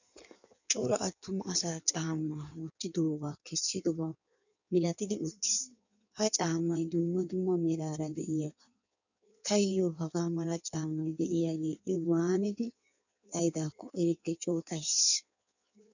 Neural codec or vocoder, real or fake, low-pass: codec, 16 kHz in and 24 kHz out, 1.1 kbps, FireRedTTS-2 codec; fake; 7.2 kHz